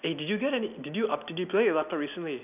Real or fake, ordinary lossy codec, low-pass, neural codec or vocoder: real; none; 3.6 kHz; none